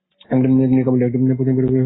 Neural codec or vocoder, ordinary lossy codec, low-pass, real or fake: none; AAC, 16 kbps; 7.2 kHz; real